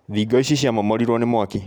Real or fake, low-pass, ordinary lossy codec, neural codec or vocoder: real; none; none; none